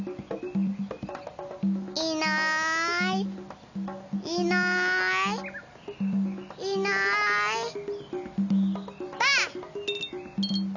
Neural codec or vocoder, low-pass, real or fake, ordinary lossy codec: none; 7.2 kHz; real; none